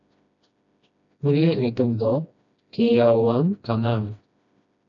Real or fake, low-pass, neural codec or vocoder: fake; 7.2 kHz; codec, 16 kHz, 1 kbps, FreqCodec, smaller model